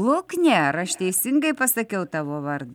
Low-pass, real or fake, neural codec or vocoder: 19.8 kHz; real; none